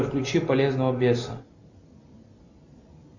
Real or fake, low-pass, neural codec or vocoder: real; 7.2 kHz; none